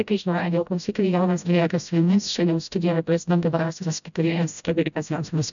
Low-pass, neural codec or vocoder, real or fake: 7.2 kHz; codec, 16 kHz, 0.5 kbps, FreqCodec, smaller model; fake